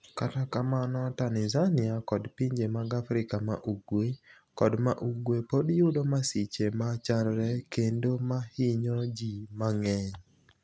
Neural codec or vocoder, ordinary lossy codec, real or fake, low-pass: none; none; real; none